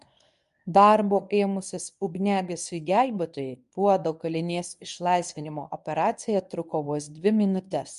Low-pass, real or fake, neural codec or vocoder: 10.8 kHz; fake; codec, 24 kHz, 0.9 kbps, WavTokenizer, medium speech release version 1